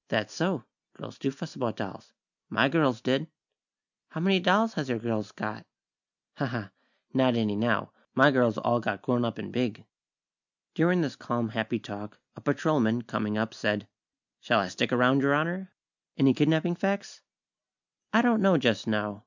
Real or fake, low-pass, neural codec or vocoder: real; 7.2 kHz; none